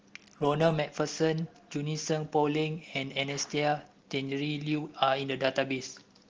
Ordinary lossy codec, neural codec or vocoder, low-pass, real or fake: Opus, 16 kbps; none; 7.2 kHz; real